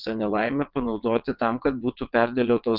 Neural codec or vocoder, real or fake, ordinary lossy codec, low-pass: vocoder, 22.05 kHz, 80 mel bands, WaveNeXt; fake; Opus, 24 kbps; 5.4 kHz